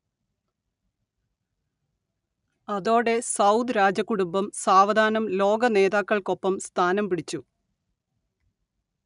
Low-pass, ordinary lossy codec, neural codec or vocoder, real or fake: 10.8 kHz; none; none; real